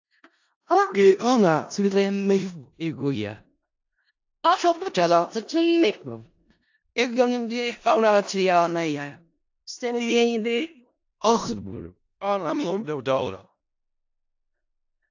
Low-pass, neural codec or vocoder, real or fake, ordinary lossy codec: 7.2 kHz; codec, 16 kHz in and 24 kHz out, 0.4 kbps, LongCat-Audio-Codec, four codebook decoder; fake; AAC, 48 kbps